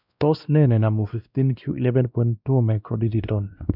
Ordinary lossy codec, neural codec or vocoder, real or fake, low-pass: none; codec, 16 kHz, 1 kbps, X-Codec, WavLM features, trained on Multilingual LibriSpeech; fake; 5.4 kHz